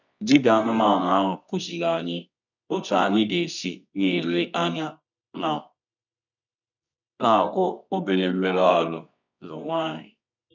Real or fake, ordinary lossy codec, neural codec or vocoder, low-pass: fake; none; codec, 24 kHz, 0.9 kbps, WavTokenizer, medium music audio release; 7.2 kHz